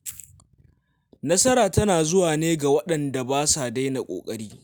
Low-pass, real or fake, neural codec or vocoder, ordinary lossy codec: none; real; none; none